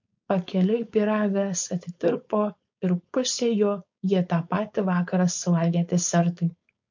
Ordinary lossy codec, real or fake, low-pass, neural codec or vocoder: MP3, 48 kbps; fake; 7.2 kHz; codec, 16 kHz, 4.8 kbps, FACodec